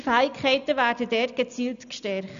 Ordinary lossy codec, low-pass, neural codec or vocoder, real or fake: none; 7.2 kHz; none; real